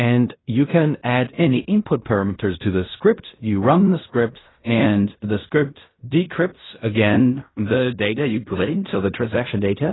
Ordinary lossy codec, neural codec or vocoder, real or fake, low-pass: AAC, 16 kbps; codec, 16 kHz in and 24 kHz out, 0.4 kbps, LongCat-Audio-Codec, fine tuned four codebook decoder; fake; 7.2 kHz